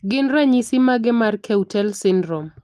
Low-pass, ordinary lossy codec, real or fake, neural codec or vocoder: 14.4 kHz; Opus, 32 kbps; real; none